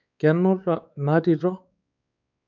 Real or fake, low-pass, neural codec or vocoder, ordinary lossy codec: fake; 7.2 kHz; codec, 16 kHz, 4 kbps, X-Codec, WavLM features, trained on Multilingual LibriSpeech; none